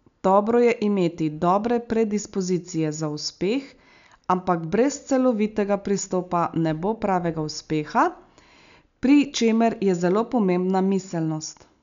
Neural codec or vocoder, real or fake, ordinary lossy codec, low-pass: none; real; none; 7.2 kHz